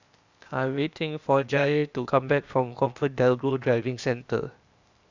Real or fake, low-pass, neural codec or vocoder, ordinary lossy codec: fake; 7.2 kHz; codec, 16 kHz, 0.8 kbps, ZipCodec; Opus, 64 kbps